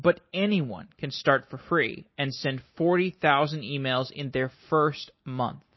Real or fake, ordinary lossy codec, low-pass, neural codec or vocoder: real; MP3, 24 kbps; 7.2 kHz; none